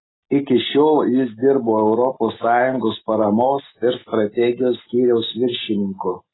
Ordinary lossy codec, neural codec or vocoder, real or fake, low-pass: AAC, 16 kbps; none; real; 7.2 kHz